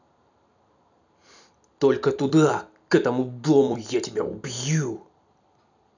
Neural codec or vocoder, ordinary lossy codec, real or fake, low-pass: vocoder, 44.1 kHz, 128 mel bands every 256 samples, BigVGAN v2; none; fake; 7.2 kHz